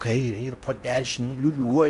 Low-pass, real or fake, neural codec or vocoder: 10.8 kHz; fake; codec, 16 kHz in and 24 kHz out, 0.8 kbps, FocalCodec, streaming, 65536 codes